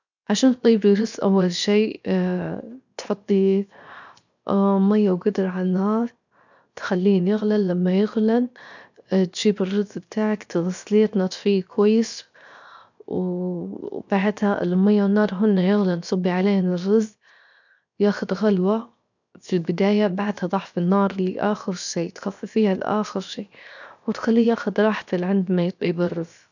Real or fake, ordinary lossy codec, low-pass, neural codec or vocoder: fake; none; 7.2 kHz; codec, 16 kHz, 0.7 kbps, FocalCodec